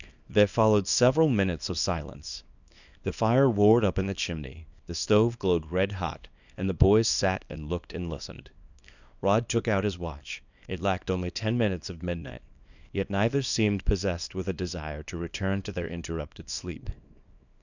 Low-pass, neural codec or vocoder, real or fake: 7.2 kHz; codec, 24 kHz, 0.9 kbps, WavTokenizer, small release; fake